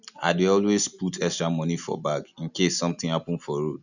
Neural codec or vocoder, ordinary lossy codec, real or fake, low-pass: none; none; real; 7.2 kHz